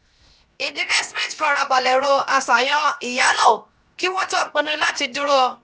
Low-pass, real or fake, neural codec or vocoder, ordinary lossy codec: none; fake; codec, 16 kHz, 0.7 kbps, FocalCodec; none